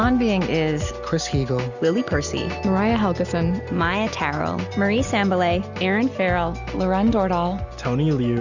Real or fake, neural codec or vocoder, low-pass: real; none; 7.2 kHz